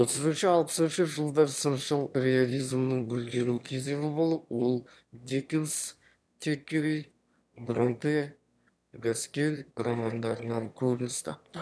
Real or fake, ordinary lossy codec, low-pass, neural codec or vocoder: fake; none; none; autoencoder, 22.05 kHz, a latent of 192 numbers a frame, VITS, trained on one speaker